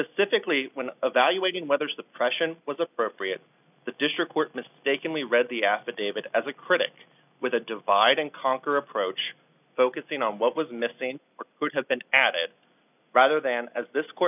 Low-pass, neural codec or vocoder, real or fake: 3.6 kHz; none; real